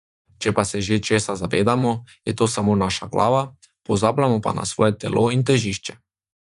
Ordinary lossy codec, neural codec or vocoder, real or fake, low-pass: none; vocoder, 24 kHz, 100 mel bands, Vocos; fake; 10.8 kHz